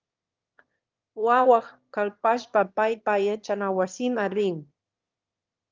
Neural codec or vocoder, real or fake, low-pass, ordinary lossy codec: autoencoder, 22.05 kHz, a latent of 192 numbers a frame, VITS, trained on one speaker; fake; 7.2 kHz; Opus, 24 kbps